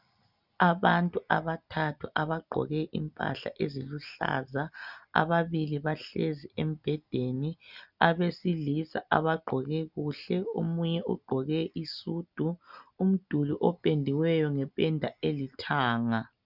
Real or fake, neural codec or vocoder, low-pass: real; none; 5.4 kHz